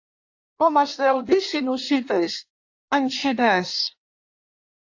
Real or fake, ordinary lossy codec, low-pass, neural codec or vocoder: fake; AAC, 48 kbps; 7.2 kHz; codec, 16 kHz in and 24 kHz out, 1.1 kbps, FireRedTTS-2 codec